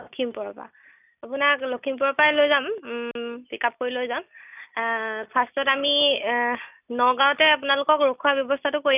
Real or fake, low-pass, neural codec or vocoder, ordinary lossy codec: real; 3.6 kHz; none; none